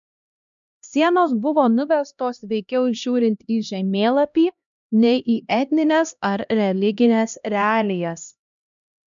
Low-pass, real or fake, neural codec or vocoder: 7.2 kHz; fake; codec, 16 kHz, 1 kbps, X-Codec, HuBERT features, trained on LibriSpeech